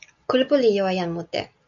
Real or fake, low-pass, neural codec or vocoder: real; 7.2 kHz; none